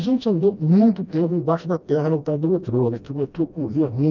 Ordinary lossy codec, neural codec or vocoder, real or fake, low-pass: none; codec, 16 kHz, 1 kbps, FreqCodec, smaller model; fake; 7.2 kHz